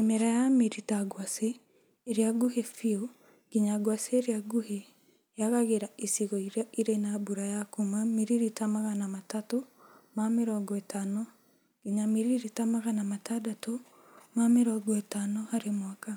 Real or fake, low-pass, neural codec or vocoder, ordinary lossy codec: real; none; none; none